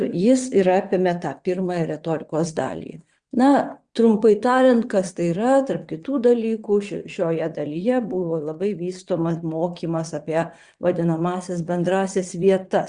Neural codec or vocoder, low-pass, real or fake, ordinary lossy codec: vocoder, 22.05 kHz, 80 mel bands, WaveNeXt; 9.9 kHz; fake; Opus, 64 kbps